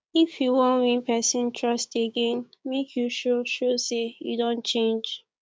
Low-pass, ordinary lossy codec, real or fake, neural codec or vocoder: none; none; fake; codec, 16 kHz, 6 kbps, DAC